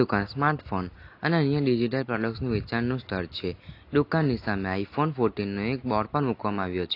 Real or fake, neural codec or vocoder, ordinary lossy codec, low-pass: real; none; AAC, 32 kbps; 5.4 kHz